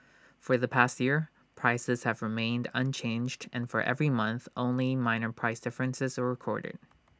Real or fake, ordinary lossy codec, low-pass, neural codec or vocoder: real; none; none; none